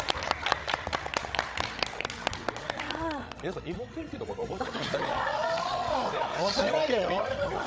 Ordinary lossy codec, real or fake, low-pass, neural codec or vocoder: none; fake; none; codec, 16 kHz, 16 kbps, FreqCodec, larger model